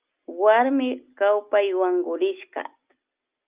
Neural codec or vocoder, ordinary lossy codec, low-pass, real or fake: none; Opus, 24 kbps; 3.6 kHz; real